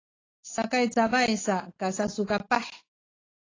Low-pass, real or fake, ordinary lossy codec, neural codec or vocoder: 7.2 kHz; real; AAC, 32 kbps; none